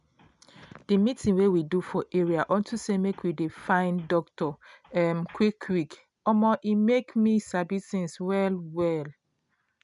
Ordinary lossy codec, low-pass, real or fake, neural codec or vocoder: none; 10.8 kHz; real; none